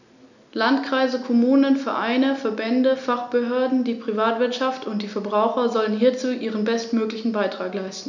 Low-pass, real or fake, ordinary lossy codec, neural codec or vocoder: 7.2 kHz; real; none; none